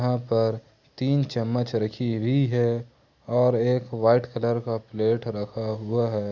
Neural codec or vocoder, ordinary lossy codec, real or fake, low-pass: none; Opus, 64 kbps; real; 7.2 kHz